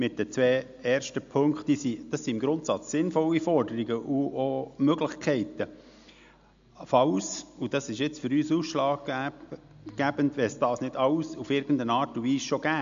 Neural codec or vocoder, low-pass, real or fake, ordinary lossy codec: none; 7.2 kHz; real; MP3, 64 kbps